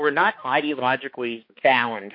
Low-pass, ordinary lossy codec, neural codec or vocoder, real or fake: 5.4 kHz; MP3, 32 kbps; codec, 16 kHz, 2 kbps, X-Codec, HuBERT features, trained on balanced general audio; fake